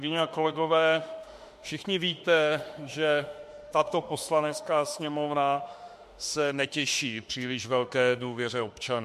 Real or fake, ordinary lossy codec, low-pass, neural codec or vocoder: fake; MP3, 64 kbps; 14.4 kHz; autoencoder, 48 kHz, 32 numbers a frame, DAC-VAE, trained on Japanese speech